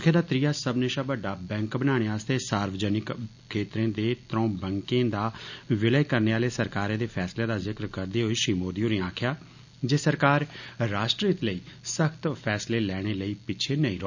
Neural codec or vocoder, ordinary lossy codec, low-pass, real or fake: none; none; 7.2 kHz; real